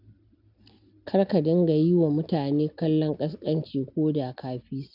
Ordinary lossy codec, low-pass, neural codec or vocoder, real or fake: none; 5.4 kHz; none; real